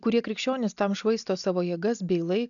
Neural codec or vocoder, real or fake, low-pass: none; real; 7.2 kHz